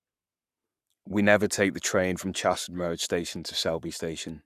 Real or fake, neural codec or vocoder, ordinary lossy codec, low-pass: fake; codec, 44.1 kHz, 7.8 kbps, Pupu-Codec; none; 14.4 kHz